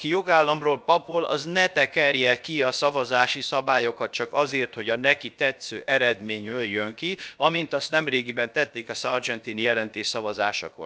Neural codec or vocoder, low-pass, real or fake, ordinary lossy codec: codec, 16 kHz, about 1 kbps, DyCAST, with the encoder's durations; none; fake; none